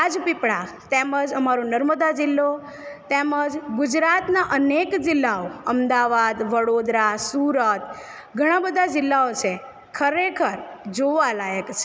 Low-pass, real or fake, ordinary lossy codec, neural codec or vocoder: none; real; none; none